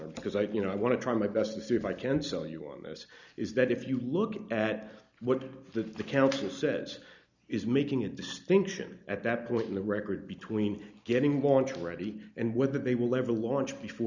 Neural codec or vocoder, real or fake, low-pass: none; real; 7.2 kHz